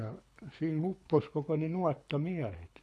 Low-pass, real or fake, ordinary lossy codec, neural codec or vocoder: none; fake; none; codec, 24 kHz, 6 kbps, HILCodec